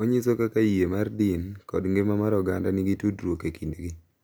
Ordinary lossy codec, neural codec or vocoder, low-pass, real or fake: none; none; none; real